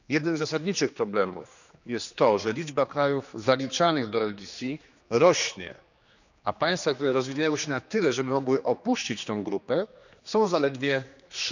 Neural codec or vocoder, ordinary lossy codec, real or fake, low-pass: codec, 16 kHz, 2 kbps, X-Codec, HuBERT features, trained on general audio; none; fake; 7.2 kHz